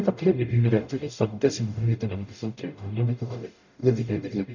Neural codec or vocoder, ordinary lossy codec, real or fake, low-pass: codec, 44.1 kHz, 0.9 kbps, DAC; none; fake; 7.2 kHz